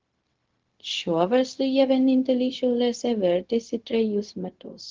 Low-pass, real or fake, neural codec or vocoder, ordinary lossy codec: 7.2 kHz; fake; codec, 16 kHz, 0.4 kbps, LongCat-Audio-Codec; Opus, 16 kbps